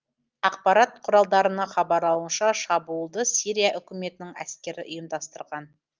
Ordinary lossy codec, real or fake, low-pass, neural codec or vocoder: Opus, 32 kbps; real; 7.2 kHz; none